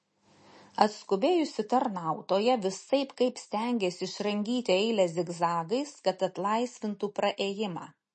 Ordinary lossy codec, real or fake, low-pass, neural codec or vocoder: MP3, 32 kbps; real; 10.8 kHz; none